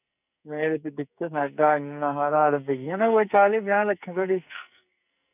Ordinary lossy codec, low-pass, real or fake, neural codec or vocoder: none; 3.6 kHz; fake; codec, 44.1 kHz, 2.6 kbps, SNAC